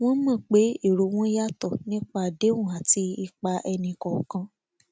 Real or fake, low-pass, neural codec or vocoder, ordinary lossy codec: real; none; none; none